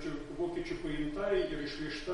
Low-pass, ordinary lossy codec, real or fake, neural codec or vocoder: 19.8 kHz; MP3, 48 kbps; real; none